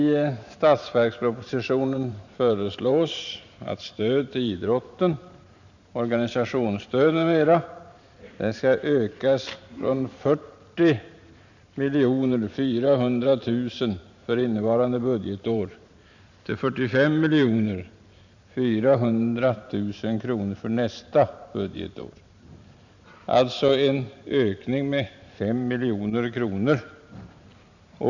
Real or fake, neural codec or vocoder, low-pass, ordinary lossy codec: fake; vocoder, 44.1 kHz, 128 mel bands every 512 samples, BigVGAN v2; 7.2 kHz; none